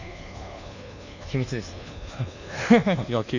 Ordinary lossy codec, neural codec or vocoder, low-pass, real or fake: none; codec, 24 kHz, 1.2 kbps, DualCodec; 7.2 kHz; fake